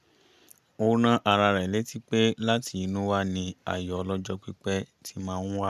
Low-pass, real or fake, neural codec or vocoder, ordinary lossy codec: 14.4 kHz; real; none; none